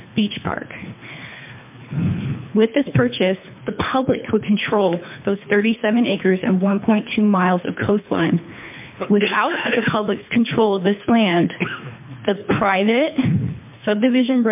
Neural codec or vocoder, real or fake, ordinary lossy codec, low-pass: codec, 24 kHz, 3 kbps, HILCodec; fake; MP3, 32 kbps; 3.6 kHz